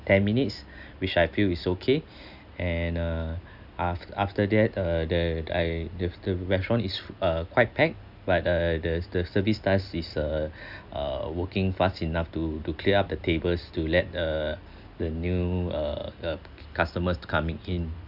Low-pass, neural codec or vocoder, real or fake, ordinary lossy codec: 5.4 kHz; none; real; none